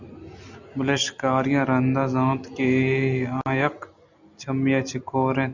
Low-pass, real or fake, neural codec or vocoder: 7.2 kHz; real; none